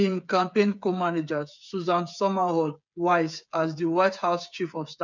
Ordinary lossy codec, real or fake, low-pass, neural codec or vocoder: none; fake; 7.2 kHz; codec, 16 kHz, 8 kbps, FreqCodec, smaller model